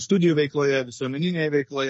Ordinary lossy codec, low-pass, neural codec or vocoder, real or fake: MP3, 32 kbps; 10.8 kHz; codec, 44.1 kHz, 2.6 kbps, SNAC; fake